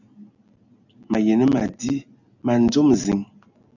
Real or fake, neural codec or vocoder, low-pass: real; none; 7.2 kHz